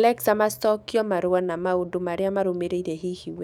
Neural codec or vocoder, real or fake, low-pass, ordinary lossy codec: autoencoder, 48 kHz, 128 numbers a frame, DAC-VAE, trained on Japanese speech; fake; 19.8 kHz; none